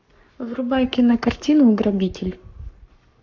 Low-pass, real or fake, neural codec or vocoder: 7.2 kHz; fake; codec, 44.1 kHz, 7.8 kbps, Pupu-Codec